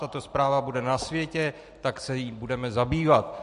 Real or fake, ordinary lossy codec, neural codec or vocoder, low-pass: real; MP3, 48 kbps; none; 14.4 kHz